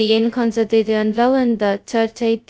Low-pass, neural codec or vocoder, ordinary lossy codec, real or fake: none; codec, 16 kHz, 0.2 kbps, FocalCodec; none; fake